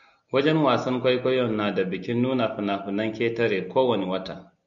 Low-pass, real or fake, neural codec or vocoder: 7.2 kHz; real; none